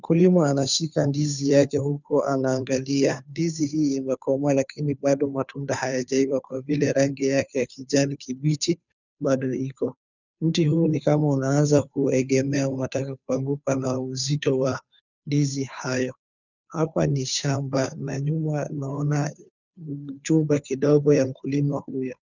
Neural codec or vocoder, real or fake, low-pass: codec, 16 kHz, 2 kbps, FunCodec, trained on Chinese and English, 25 frames a second; fake; 7.2 kHz